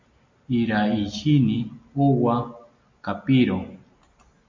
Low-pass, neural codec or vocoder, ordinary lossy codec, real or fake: 7.2 kHz; none; MP3, 48 kbps; real